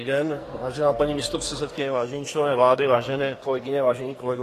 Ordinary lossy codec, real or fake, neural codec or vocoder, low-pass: AAC, 48 kbps; fake; codec, 44.1 kHz, 2.6 kbps, SNAC; 14.4 kHz